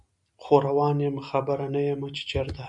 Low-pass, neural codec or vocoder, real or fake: 10.8 kHz; none; real